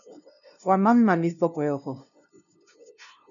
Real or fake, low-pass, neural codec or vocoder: fake; 7.2 kHz; codec, 16 kHz, 0.5 kbps, FunCodec, trained on LibriTTS, 25 frames a second